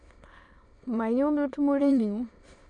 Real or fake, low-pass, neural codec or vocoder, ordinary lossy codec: fake; 9.9 kHz; autoencoder, 22.05 kHz, a latent of 192 numbers a frame, VITS, trained on many speakers; none